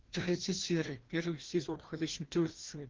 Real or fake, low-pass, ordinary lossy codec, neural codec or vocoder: fake; 7.2 kHz; Opus, 16 kbps; codec, 16 kHz, 1 kbps, FreqCodec, larger model